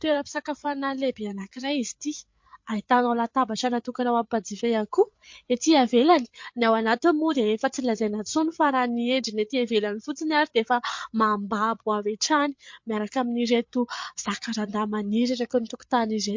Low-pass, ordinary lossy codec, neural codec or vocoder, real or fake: 7.2 kHz; MP3, 48 kbps; codec, 16 kHz, 8 kbps, FreqCodec, larger model; fake